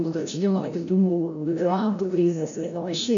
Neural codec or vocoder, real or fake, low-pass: codec, 16 kHz, 0.5 kbps, FreqCodec, larger model; fake; 7.2 kHz